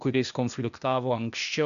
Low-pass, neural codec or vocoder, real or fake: 7.2 kHz; codec, 16 kHz, 0.8 kbps, ZipCodec; fake